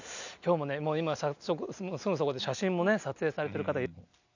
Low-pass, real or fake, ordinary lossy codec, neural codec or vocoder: 7.2 kHz; real; none; none